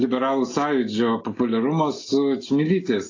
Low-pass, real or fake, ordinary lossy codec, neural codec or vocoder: 7.2 kHz; real; AAC, 32 kbps; none